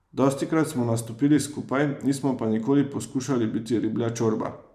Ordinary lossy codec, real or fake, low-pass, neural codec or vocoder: none; fake; 14.4 kHz; vocoder, 44.1 kHz, 128 mel bands every 512 samples, BigVGAN v2